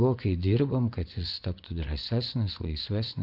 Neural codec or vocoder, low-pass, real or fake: vocoder, 24 kHz, 100 mel bands, Vocos; 5.4 kHz; fake